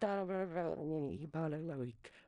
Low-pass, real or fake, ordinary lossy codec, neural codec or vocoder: 10.8 kHz; fake; none; codec, 16 kHz in and 24 kHz out, 0.4 kbps, LongCat-Audio-Codec, four codebook decoder